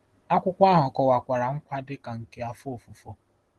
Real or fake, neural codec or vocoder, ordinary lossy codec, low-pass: real; none; Opus, 16 kbps; 10.8 kHz